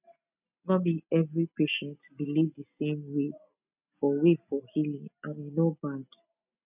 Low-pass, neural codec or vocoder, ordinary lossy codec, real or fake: 3.6 kHz; none; none; real